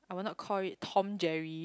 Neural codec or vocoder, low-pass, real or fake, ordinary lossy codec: none; none; real; none